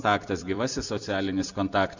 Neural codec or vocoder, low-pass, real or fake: vocoder, 44.1 kHz, 128 mel bands every 256 samples, BigVGAN v2; 7.2 kHz; fake